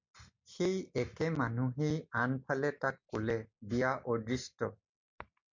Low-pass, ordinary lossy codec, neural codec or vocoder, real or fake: 7.2 kHz; MP3, 64 kbps; none; real